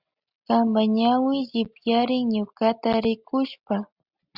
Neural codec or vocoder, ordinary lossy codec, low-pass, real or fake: none; Opus, 64 kbps; 5.4 kHz; real